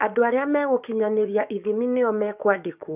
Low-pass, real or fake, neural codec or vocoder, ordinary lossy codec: 3.6 kHz; fake; codec, 44.1 kHz, 7.8 kbps, DAC; none